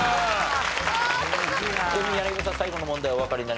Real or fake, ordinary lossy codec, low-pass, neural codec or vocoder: real; none; none; none